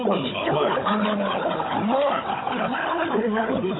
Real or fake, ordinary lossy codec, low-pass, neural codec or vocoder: fake; AAC, 16 kbps; 7.2 kHz; codec, 16 kHz, 16 kbps, FunCodec, trained on Chinese and English, 50 frames a second